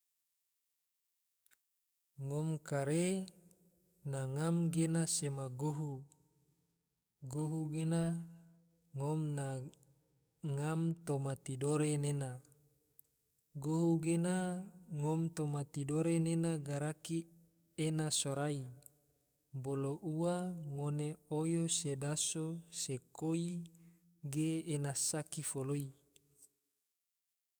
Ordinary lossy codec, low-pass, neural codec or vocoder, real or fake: none; none; codec, 44.1 kHz, 7.8 kbps, DAC; fake